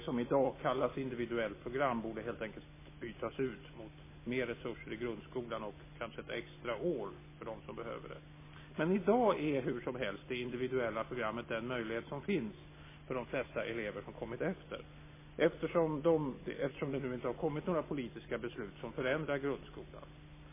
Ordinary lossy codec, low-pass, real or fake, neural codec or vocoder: MP3, 16 kbps; 3.6 kHz; real; none